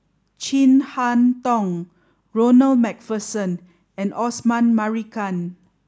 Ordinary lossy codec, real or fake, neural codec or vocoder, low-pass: none; real; none; none